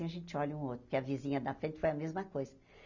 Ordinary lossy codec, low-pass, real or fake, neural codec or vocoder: none; 7.2 kHz; real; none